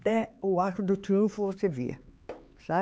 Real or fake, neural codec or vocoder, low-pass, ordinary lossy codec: fake; codec, 16 kHz, 4 kbps, X-Codec, HuBERT features, trained on LibriSpeech; none; none